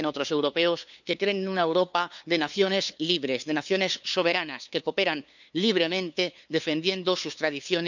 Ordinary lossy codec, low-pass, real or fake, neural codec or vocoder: none; 7.2 kHz; fake; codec, 16 kHz, 2 kbps, FunCodec, trained on LibriTTS, 25 frames a second